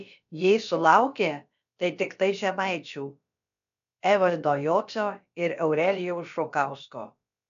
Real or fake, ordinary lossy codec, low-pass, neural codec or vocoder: fake; AAC, 96 kbps; 7.2 kHz; codec, 16 kHz, about 1 kbps, DyCAST, with the encoder's durations